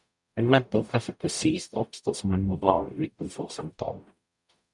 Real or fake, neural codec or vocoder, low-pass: fake; codec, 44.1 kHz, 0.9 kbps, DAC; 10.8 kHz